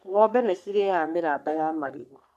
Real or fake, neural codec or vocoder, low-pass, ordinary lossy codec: fake; codec, 44.1 kHz, 3.4 kbps, Pupu-Codec; 14.4 kHz; none